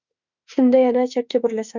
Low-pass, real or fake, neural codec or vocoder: 7.2 kHz; fake; autoencoder, 48 kHz, 32 numbers a frame, DAC-VAE, trained on Japanese speech